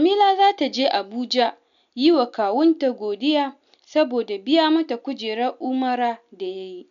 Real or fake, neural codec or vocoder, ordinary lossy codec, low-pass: real; none; none; 7.2 kHz